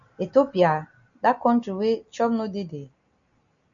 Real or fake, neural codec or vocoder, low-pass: real; none; 7.2 kHz